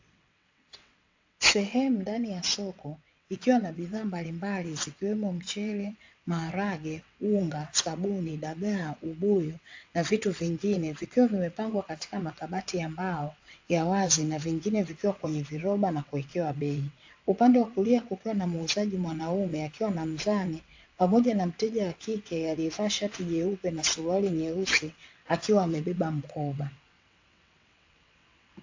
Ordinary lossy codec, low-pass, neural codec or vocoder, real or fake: AAC, 48 kbps; 7.2 kHz; vocoder, 22.05 kHz, 80 mel bands, WaveNeXt; fake